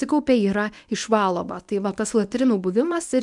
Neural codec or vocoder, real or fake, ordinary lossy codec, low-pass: codec, 24 kHz, 0.9 kbps, WavTokenizer, medium speech release version 1; fake; MP3, 96 kbps; 10.8 kHz